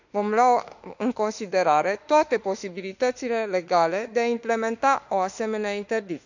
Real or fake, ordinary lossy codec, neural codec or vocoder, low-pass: fake; none; autoencoder, 48 kHz, 32 numbers a frame, DAC-VAE, trained on Japanese speech; 7.2 kHz